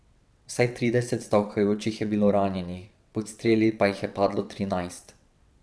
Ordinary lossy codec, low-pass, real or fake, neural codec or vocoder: none; none; fake; vocoder, 22.05 kHz, 80 mel bands, WaveNeXt